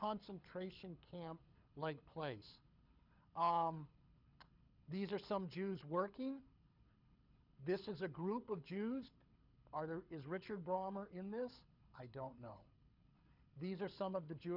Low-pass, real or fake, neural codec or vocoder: 5.4 kHz; fake; codec, 24 kHz, 6 kbps, HILCodec